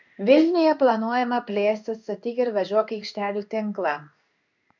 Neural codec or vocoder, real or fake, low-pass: codec, 16 kHz in and 24 kHz out, 1 kbps, XY-Tokenizer; fake; 7.2 kHz